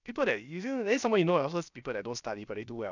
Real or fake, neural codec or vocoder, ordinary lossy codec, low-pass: fake; codec, 16 kHz, about 1 kbps, DyCAST, with the encoder's durations; none; 7.2 kHz